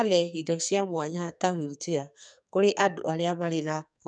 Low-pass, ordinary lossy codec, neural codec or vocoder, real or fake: 9.9 kHz; none; codec, 44.1 kHz, 2.6 kbps, SNAC; fake